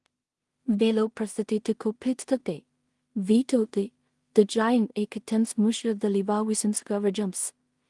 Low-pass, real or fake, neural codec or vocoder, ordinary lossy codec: 10.8 kHz; fake; codec, 16 kHz in and 24 kHz out, 0.4 kbps, LongCat-Audio-Codec, two codebook decoder; Opus, 24 kbps